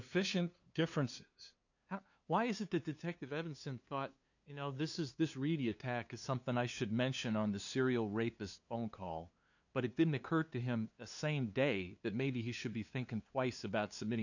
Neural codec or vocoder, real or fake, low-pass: codec, 16 kHz, 2 kbps, FunCodec, trained on LibriTTS, 25 frames a second; fake; 7.2 kHz